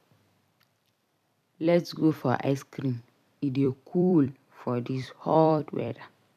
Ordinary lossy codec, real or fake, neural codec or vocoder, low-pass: none; fake; vocoder, 44.1 kHz, 128 mel bands every 256 samples, BigVGAN v2; 14.4 kHz